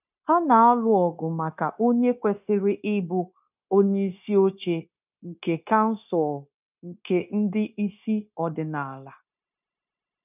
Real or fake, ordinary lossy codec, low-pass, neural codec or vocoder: fake; none; 3.6 kHz; codec, 16 kHz, 0.9 kbps, LongCat-Audio-Codec